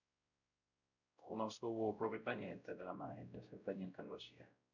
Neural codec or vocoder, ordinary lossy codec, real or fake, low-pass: codec, 16 kHz, 0.5 kbps, X-Codec, WavLM features, trained on Multilingual LibriSpeech; none; fake; 7.2 kHz